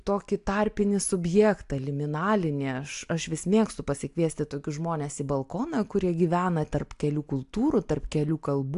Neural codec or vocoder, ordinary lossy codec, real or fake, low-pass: none; AAC, 64 kbps; real; 10.8 kHz